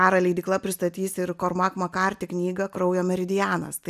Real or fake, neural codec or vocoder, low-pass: real; none; 14.4 kHz